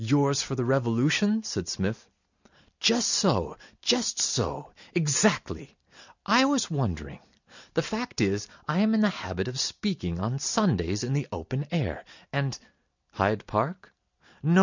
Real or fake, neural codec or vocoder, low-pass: real; none; 7.2 kHz